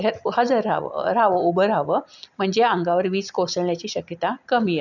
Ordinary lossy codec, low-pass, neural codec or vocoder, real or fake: none; 7.2 kHz; none; real